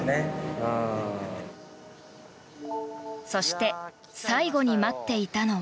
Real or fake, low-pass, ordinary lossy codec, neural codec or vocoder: real; none; none; none